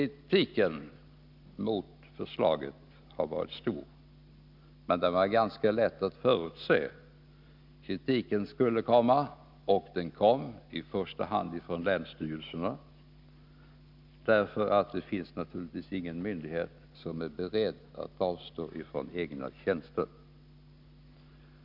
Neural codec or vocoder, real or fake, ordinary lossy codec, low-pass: none; real; none; 5.4 kHz